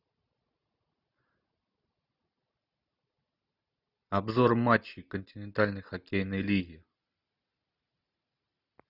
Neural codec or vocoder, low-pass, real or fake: none; 5.4 kHz; real